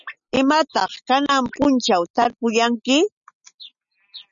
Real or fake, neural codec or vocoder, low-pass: real; none; 7.2 kHz